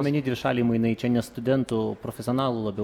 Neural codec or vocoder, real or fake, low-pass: none; real; 19.8 kHz